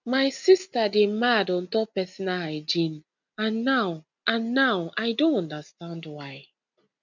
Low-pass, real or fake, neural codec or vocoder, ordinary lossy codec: 7.2 kHz; real; none; none